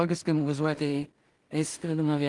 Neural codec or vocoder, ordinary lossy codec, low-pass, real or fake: codec, 16 kHz in and 24 kHz out, 0.4 kbps, LongCat-Audio-Codec, two codebook decoder; Opus, 24 kbps; 10.8 kHz; fake